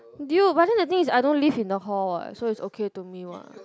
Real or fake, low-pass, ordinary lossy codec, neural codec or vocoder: real; none; none; none